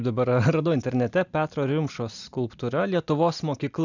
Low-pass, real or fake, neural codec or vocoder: 7.2 kHz; real; none